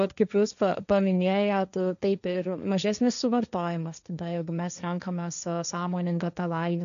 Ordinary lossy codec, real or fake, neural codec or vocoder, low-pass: MP3, 96 kbps; fake; codec, 16 kHz, 1.1 kbps, Voila-Tokenizer; 7.2 kHz